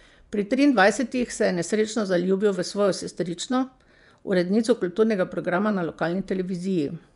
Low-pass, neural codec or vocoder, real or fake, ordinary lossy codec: 10.8 kHz; vocoder, 24 kHz, 100 mel bands, Vocos; fake; none